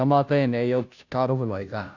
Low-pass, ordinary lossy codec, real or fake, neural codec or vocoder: 7.2 kHz; none; fake; codec, 16 kHz, 0.5 kbps, FunCodec, trained on Chinese and English, 25 frames a second